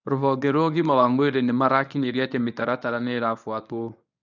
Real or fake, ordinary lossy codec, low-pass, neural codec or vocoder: fake; none; 7.2 kHz; codec, 24 kHz, 0.9 kbps, WavTokenizer, medium speech release version 1